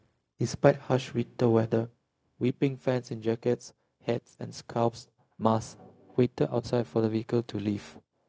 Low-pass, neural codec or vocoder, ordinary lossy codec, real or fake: none; codec, 16 kHz, 0.4 kbps, LongCat-Audio-Codec; none; fake